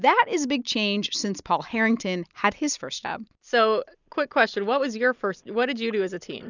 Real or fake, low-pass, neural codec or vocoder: real; 7.2 kHz; none